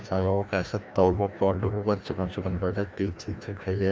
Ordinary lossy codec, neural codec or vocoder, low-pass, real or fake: none; codec, 16 kHz, 1 kbps, FreqCodec, larger model; none; fake